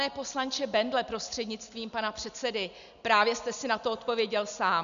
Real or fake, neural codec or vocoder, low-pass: real; none; 7.2 kHz